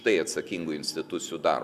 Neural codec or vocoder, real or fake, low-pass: none; real; 14.4 kHz